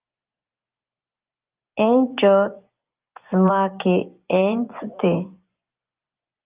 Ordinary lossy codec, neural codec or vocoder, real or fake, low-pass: Opus, 32 kbps; vocoder, 24 kHz, 100 mel bands, Vocos; fake; 3.6 kHz